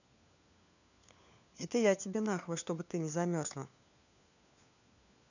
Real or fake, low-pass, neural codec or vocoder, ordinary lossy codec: fake; 7.2 kHz; codec, 16 kHz, 4 kbps, FunCodec, trained on LibriTTS, 50 frames a second; none